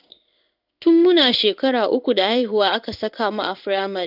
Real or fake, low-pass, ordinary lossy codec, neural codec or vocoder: fake; 5.4 kHz; none; codec, 16 kHz in and 24 kHz out, 1 kbps, XY-Tokenizer